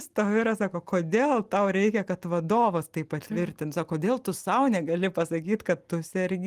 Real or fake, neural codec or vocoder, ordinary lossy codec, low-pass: real; none; Opus, 24 kbps; 14.4 kHz